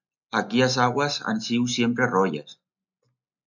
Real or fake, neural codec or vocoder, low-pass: real; none; 7.2 kHz